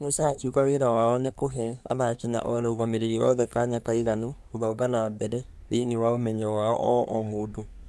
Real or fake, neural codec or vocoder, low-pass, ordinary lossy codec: fake; codec, 24 kHz, 1 kbps, SNAC; none; none